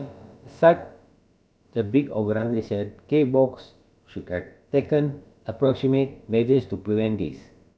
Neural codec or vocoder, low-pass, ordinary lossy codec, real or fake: codec, 16 kHz, about 1 kbps, DyCAST, with the encoder's durations; none; none; fake